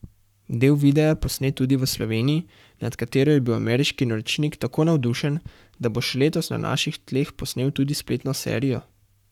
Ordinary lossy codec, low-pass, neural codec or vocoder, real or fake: none; 19.8 kHz; codec, 44.1 kHz, 7.8 kbps, Pupu-Codec; fake